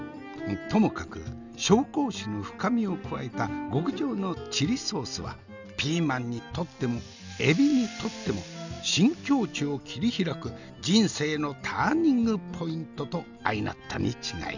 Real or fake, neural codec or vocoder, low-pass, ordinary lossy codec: real; none; 7.2 kHz; none